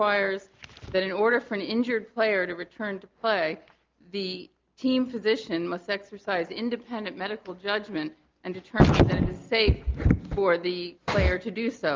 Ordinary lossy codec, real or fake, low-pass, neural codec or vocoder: Opus, 32 kbps; real; 7.2 kHz; none